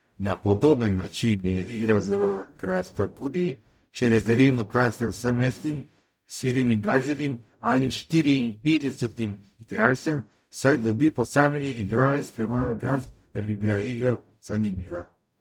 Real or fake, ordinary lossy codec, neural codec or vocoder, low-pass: fake; none; codec, 44.1 kHz, 0.9 kbps, DAC; 19.8 kHz